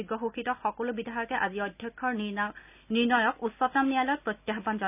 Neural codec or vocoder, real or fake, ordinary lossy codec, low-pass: none; real; none; 3.6 kHz